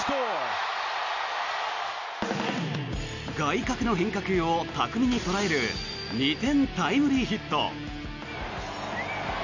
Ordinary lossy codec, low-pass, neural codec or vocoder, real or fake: none; 7.2 kHz; none; real